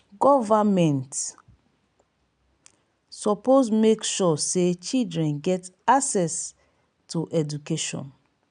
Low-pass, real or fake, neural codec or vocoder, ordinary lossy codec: 9.9 kHz; real; none; none